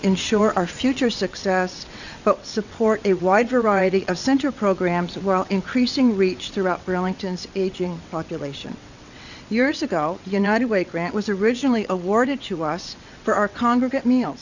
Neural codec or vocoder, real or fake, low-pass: vocoder, 22.05 kHz, 80 mel bands, WaveNeXt; fake; 7.2 kHz